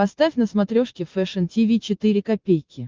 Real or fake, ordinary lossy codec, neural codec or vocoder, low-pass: real; Opus, 32 kbps; none; 7.2 kHz